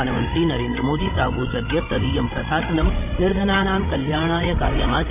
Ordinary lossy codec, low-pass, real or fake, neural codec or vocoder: none; 3.6 kHz; fake; codec, 16 kHz, 16 kbps, FreqCodec, larger model